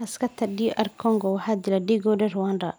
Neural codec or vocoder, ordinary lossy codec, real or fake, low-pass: none; none; real; none